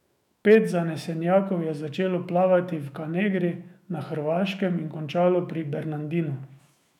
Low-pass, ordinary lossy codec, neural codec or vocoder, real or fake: 19.8 kHz; none; autoencoder, 48 kHz, 128 numbers a frame, DAC-VAE, trained on Japanese speech; fake